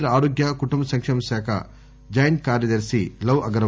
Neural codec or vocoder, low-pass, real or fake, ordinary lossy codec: none; none; real; none